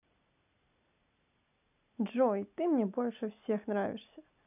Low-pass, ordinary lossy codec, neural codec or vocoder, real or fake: 3.6 kHz; none; none; real